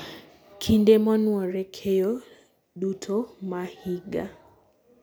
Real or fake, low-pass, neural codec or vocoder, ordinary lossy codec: real; none; none; none